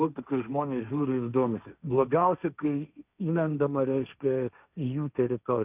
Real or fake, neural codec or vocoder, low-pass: fake; codec, 16 kHz, 1.1 kbps, Voila-Tokenizer; 3.6 kHz